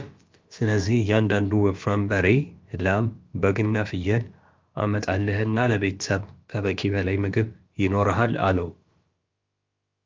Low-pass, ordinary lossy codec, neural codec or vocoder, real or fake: 7.2 kHz; Opus, 24 kbps; codec, 16 kHz, about 1 kbps, DyCAST, with the encoder's durations; fake